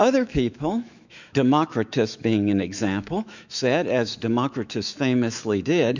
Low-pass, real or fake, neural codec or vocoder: 7.2 kHz; fake; autoencoder, 48 kHz, 128 numbers a frame, DAC-VAE, trained on Japanese speech